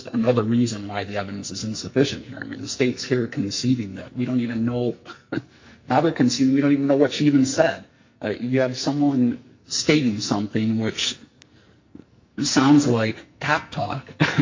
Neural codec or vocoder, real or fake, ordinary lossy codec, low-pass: codec, 44.1 kHz, 2.6 kbps, SNAC; fake; MP3, 48 kbps; 7.2 kHz